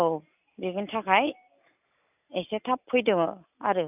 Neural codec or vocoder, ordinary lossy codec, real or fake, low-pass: none; none; real; 3.6 kHz